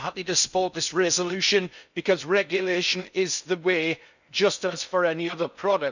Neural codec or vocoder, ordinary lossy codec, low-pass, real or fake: codec, 16 kHz in and 24 kHz out, 0.6 kbps, FocalCodec, streaming, 4096 codes; none; 7.2 kHz; fake